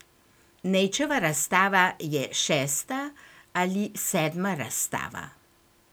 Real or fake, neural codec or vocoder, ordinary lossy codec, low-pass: real; none; none; none